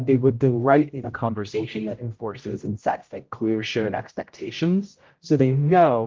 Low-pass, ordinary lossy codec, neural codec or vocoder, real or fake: 7.2 kHz; Opus, 32 kbps; codec, 16 kHz, 0.5 kbps, X-Codec, HuBERT features, trained on general audio; fake